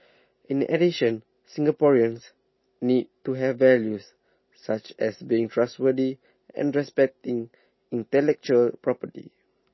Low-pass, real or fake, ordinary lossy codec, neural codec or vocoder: 7.2 kHz; real; MP3, 24 kbps; none